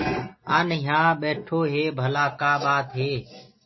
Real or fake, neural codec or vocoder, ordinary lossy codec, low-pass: real; none; MP3, 24 kbps; 7.2 kHz